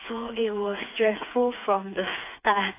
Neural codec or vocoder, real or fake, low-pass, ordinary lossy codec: codec, 16 kHz, 4 kbps, FreqCodec, smaller model; fake; 3.6 kHz; AAC, 24 kbps